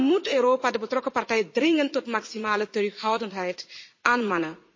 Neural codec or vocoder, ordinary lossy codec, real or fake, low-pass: vocoder, 44.1 kHz, 80 mel bands, Vocos; MP3, 32 kbps; fake; 7.2 kHz